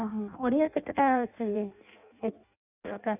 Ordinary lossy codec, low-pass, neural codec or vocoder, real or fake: none; 3.6 kHz; codec, 16 kHz in and 24 kHz out, 0.6 kbps, FireRedTTS-2 codec; fake